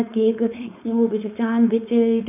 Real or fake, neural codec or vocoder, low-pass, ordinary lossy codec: fake; codec, 16 kHz, 4.8 kbps, FACodec; 3.6 kHz; none